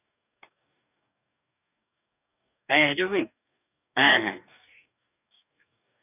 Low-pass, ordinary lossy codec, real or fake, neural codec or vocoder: 3.6 kHz; none; fake; codec, 44.1 kHz, 2.6 kbps, DAC